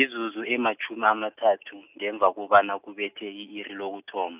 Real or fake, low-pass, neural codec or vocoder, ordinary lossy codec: real; 3.6 kHz; none; none